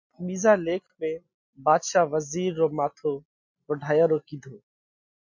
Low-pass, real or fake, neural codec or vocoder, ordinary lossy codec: 7.2 kHz; real; none; MP3, 48 kbps